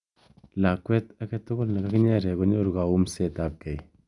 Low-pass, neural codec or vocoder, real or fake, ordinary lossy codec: 10.8 kHz; none; real; none